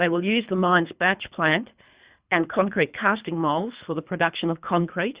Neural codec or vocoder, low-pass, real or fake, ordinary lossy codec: codec, 24 kHz, 3 kbps, HILCodec; 3.6 kHz; fake; Opus, 64 kbps